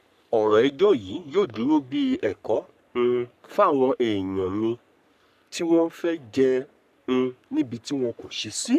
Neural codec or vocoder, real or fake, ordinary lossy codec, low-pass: codec, 44.1 kHz, 3.4 kbps, Pupu-Codec; fake; none; 14.4 kHz